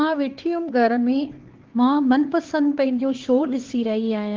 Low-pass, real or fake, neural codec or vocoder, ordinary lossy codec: 7.2 kHz; fake; codec, 16 kHz, 4 kbps, X-Codec, HuBERT features, trained on LibriSpeech; Opus, 16 kbps